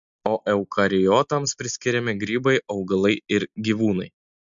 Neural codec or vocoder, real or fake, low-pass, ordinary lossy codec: none; real; 7.2 kHz; MP3, 64 kbps